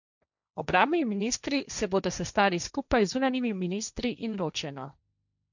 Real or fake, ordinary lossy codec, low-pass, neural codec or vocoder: fake; none; 7.2 kHz; codec, 16 kHz, 1.1 kbps, Voila-Tokenizer